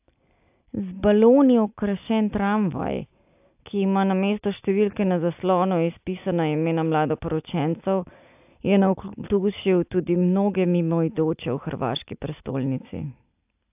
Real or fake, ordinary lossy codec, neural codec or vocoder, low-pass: real; AAC, 32 kbps; none; 3.6 kHz